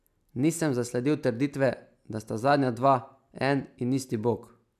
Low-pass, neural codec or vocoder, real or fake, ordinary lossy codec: 14.4 kHz; none; real; none